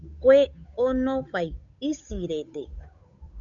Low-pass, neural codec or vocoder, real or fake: 7.2 kHz; codec, 16 kHz, 8 kbps, FunCodec, trained on Chinese and English, 25 frames a second; fake